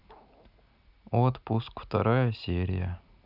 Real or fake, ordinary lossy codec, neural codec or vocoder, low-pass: real; none; none; 5.4 kHz